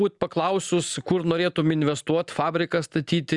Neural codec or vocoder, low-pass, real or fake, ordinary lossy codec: none; 10.8 kHz; real; Opus, 64 kbps